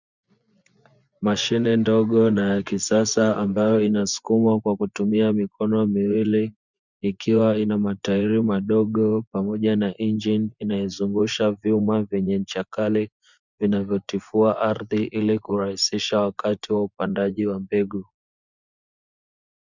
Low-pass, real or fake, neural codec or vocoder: 7.2 kHz; fake; vocoder, 24 kHz, 100 mel bands, Vocos